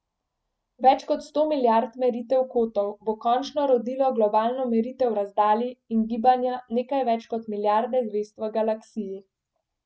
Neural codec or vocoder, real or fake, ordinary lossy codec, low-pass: none; real; none; none